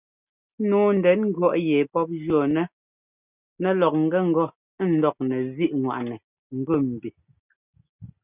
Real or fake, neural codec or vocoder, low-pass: real; none; 3.6 kHz